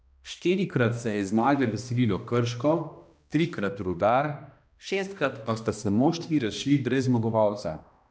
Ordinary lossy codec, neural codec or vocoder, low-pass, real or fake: none; codec, 16 kHz, 1 kbps, X-Codec, HuBERT features, trained on balanced general audio; none; fake